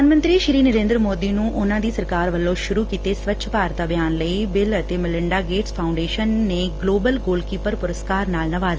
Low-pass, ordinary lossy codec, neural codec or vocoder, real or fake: 7.2 kHz; Opus, 24 kbps; none; real